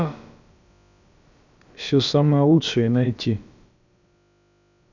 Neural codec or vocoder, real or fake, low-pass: codec, 16 kHz, about 1 kbps, DyCAST, with the encoder's durations; fake; 7.2 kHz